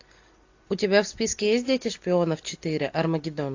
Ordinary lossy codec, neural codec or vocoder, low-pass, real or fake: AAC, 48 kbps; none; 7.2 kHz; real